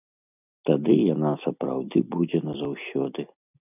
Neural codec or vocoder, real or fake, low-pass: none; real; 3.6 kHz